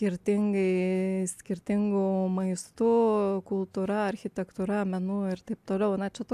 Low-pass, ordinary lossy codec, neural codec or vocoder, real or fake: 14.4 kHz; AAC, 96 kbps; none; real